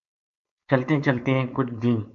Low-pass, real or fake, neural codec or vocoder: 7.2 kHz; fake; codec, 16 kHz, 4.8 kbps, FACodec